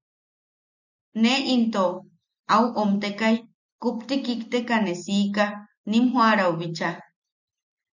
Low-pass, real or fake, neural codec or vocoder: 7.2 kHz; real; none